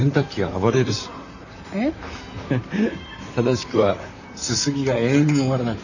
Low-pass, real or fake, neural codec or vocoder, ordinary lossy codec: 7.2 kHz; fake; vocoder, 44.1 kHz, 128 mel bands, Pupu-Vocoder; none